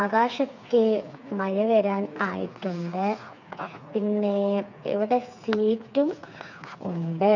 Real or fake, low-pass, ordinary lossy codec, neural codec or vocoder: fake; 7.2 kHz; none; codec, 16 kHz, 4 kbps, FreqCodec, smaller model